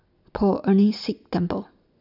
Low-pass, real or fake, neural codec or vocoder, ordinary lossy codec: 5.4 kHz; real; none; none